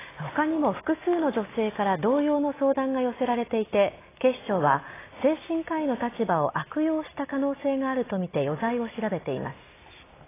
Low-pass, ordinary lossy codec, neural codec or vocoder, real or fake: 3.6 kHz; AAC, 16 kbps; none; real